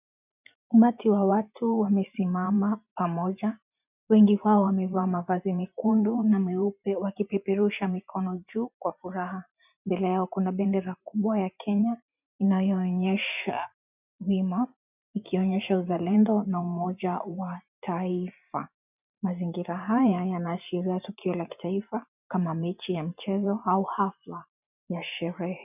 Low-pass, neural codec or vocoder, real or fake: 3.6 kHz; vocoder, 44.1 kHz, 128 mel bands every 512 samples, BigVGAN v2; fake